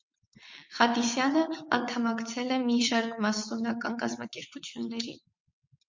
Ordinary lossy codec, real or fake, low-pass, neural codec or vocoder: MP3, 48 kbps; fake; 7.2 kHz; vocoder, 22.05 kHz, 80 mel bands, WaveNeXt